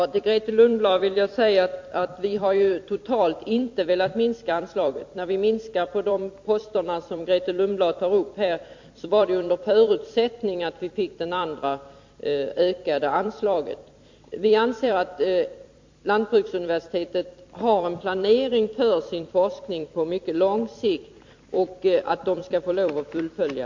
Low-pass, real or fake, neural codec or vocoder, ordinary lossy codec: 7.2 kHz; fake; vocoder, 44.1 kHz, 128 mel bands every 256 samples, BigVGAN v2; MP3, 48 kbps